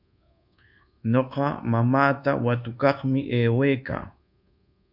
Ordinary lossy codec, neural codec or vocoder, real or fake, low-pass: MP3, 48 kbps; codec, 24 kHz, 1.2 kbps, DualCodec; fake; 5.4 kHz